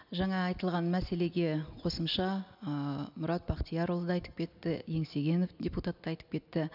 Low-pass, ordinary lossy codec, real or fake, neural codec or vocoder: 5.4 kHz; none; real; none